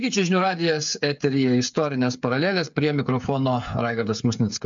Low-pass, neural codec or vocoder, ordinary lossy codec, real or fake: 7.2 kHz; codec, 16 kHz, 8 kbps, FreqCodec, smaller model; MP3, 64 kbps; fake